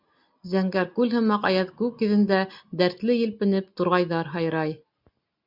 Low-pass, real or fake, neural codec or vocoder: 5.4 kHz; real; none